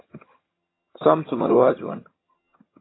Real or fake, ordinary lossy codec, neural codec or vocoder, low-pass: fake; AAC, 16 kbps; vocoder, 22.05 kHz, 80 mel bands, HiFi-GAN; 7.2 kHz